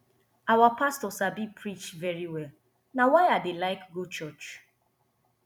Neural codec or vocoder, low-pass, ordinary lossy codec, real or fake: vocoder, 44.1 kHz, 128 mel bands every 256 samples, BigVGAN v2; 19.8 kHz; none; fake